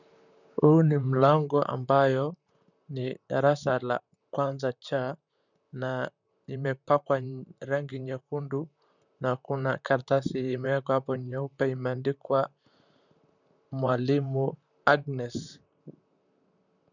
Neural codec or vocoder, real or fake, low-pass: vocoder, 44.1 kHz, 128 mel bands, Pupu-Vocoder; fake; 7.2 kHz